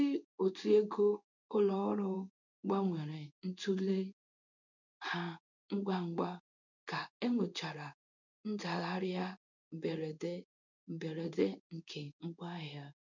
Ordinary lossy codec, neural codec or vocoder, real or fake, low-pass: none; codec, 16 kHz in and 24 kHz out, 1 kbps, XY-Tokenizer; fake; 7.2 kHz